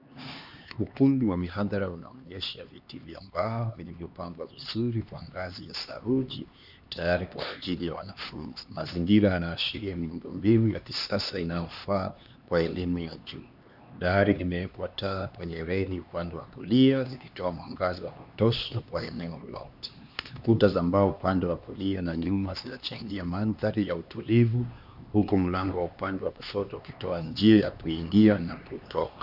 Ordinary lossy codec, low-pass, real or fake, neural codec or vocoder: Opus, 64 kbps; 5.4 kHz; fake; codec, 16 kHz, 2 kbps, X-Codec, HuBERT features, trained on LibriSpeech